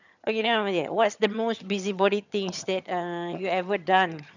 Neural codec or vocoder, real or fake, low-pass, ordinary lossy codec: vocoder, 22.05 kHz, 80 mel bands, HiFi-GAN; fake; 7.2 kHz; none